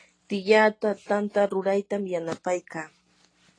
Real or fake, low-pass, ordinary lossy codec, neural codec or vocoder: real; 9.9 kHz; AAC, 32 kbps; none